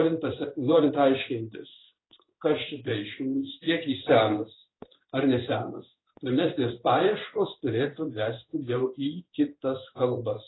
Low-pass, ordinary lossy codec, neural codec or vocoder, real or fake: 7.2 kHz; AAC, 16 kbps; codec, 16 kHz in and 24 kHz out, 1 kbps, XY-Tokenizer; fake